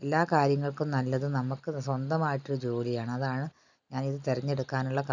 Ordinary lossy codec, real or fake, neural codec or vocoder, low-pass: none; real; none; 7.2 kHz